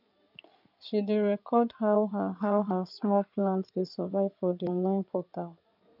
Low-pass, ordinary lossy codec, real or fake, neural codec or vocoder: 5.4 kHz; none; fake; codec, 16 kHz in and 24 kHz out, 2.2 kbps, FireRedTTS-2 codec